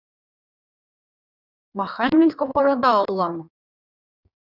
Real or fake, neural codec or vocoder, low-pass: fake; codec, 16 kHz in and 24 kHz out, 1.1 kbps, FireRedTTS-2 codec; 5.4 kHz